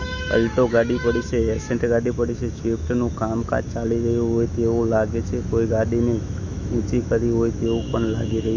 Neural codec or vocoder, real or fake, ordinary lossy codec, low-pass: autoencoder, 48 kHz, 128 numbers a frame, DAC-VAE, trained on Japanese speech; fake; Opus, 64 kbps; 7.2 kHz